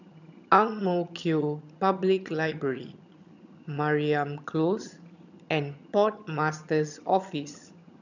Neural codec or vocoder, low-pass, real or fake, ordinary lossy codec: vocoder, 22.05 kHz, 80 mel bands, HiFi-GAN; 7.2 kHz; fake; none